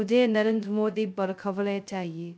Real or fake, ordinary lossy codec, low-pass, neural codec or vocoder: fake; none; none; codec, 16 kHz, 0.2 kbps, FocalCodec